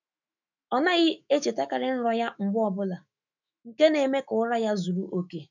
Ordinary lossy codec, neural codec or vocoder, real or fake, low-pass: none; autoencoder, 48 kHz, 128 numbers a frame, DAC-VAE, trained on Japanese speech; fake; 7.2 kHz